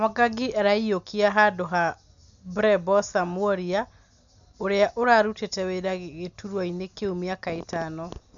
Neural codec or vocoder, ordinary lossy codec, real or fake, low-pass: none; none; real; 7.2 kHz